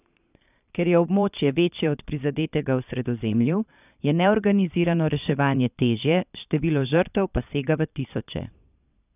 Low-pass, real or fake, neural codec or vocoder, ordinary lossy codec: 3.6 kHz; fake; vocoder, 22.05 kHz, 80 mel bands, Vocos; none